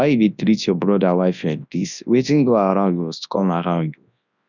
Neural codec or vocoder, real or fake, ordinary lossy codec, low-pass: codec, 24 kHz, 0.9 kbps, WavTokenizer, large speech release; fake; none; 7.2 kHz